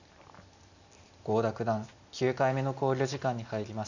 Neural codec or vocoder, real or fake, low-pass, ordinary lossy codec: none; real; 7.2 kHz; none